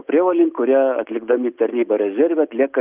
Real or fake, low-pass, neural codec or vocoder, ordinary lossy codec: real; 3.6 kHz; none; Opus, 24 kbps